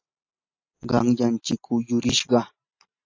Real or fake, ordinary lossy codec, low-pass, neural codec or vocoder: real; AAC, 48 kbps; 7.2 kHz; none